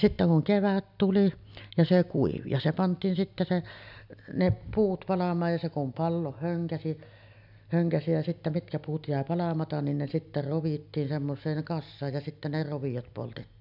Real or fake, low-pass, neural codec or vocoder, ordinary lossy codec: real; 5.4 kHz; none; none